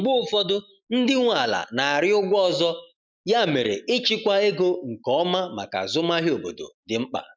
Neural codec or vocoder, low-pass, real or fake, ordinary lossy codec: none; none; real; none